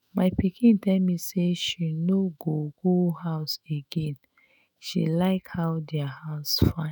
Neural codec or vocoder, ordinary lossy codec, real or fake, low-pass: autoencoder, 48 kHz, 128 numbers a frame, DAC-VAE, trained on Japanese speech; none; fake; none